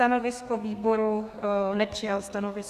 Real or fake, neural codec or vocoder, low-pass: fake; codec, 32 kHz, 1.9 kbps, SNAC; 14.4 kHz